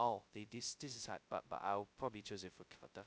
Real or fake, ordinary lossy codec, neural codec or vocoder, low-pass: fake; none; codec, 16 kHz, 0.2 kbps, FocalCodec; none